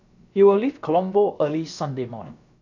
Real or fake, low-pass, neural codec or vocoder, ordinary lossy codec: fake; 7.2 kHz; codec, 16 kHz, about 1 kbps, DyCAST, with the encoder's durations; none